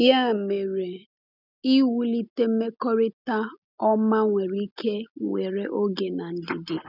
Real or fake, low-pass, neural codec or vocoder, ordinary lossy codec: real; 5.4 kHz; none; none